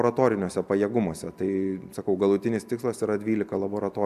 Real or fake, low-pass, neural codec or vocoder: real; 14.4 kHz; none